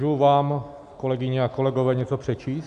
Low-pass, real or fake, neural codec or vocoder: 10.8 kHz; real; none